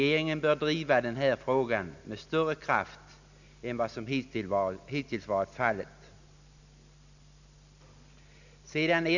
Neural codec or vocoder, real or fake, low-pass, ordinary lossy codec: none; real; 7.2 kHz; none